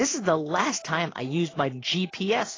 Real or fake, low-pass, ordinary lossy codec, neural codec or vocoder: fake; 7.2 kHz; AAC, 32 kbps; codec, 16 kHz in and 24 kHz out, 1 kbps, XY-Tokenizer